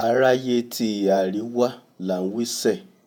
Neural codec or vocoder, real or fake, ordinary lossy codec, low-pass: vocoder, 48 kHz, 128 mel bands, Vocos; fake; none; none